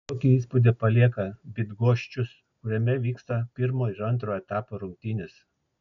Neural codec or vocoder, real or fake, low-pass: none; real; 7.2 kHz